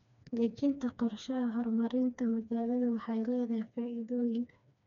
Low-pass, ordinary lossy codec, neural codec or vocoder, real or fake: 7.2 kHz; none; codec, 16 kHz, 2 kbps, FreqCodec, smaller model; fake